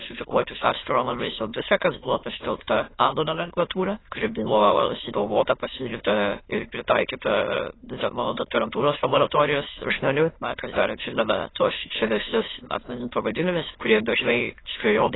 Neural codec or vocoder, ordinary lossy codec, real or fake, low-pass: autoencoder, 22.05 kHz, a latent of 192 numbers a frame, VITS, trained on many speakers; AAC, 16 kbps; fake; 7.2 kHz